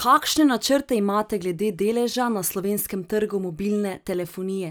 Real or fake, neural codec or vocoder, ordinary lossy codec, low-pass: real; none; none; none